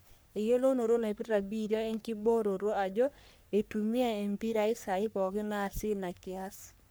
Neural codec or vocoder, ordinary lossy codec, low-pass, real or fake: codec, 44.1 kHz, 3.4 kbps, Pupu-Codec; none; none; fake